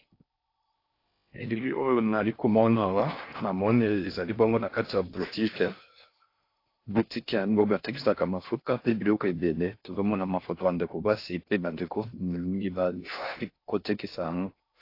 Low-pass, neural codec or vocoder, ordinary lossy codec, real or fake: 5.4 kHz; codec, 16 kHz in and 24 kHz out, 0.6 kbps, FocalCodec, streaming, 4096 codes; AAC, 32 kbps; fake